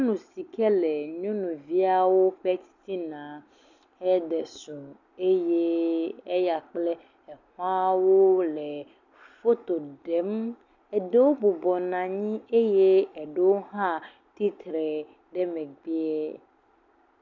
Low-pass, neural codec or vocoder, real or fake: 7.2 kHz; none; real